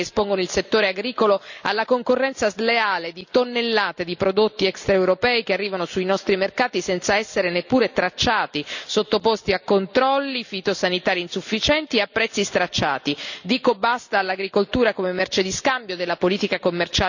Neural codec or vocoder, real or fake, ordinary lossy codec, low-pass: none; real; none; 7.2 kHz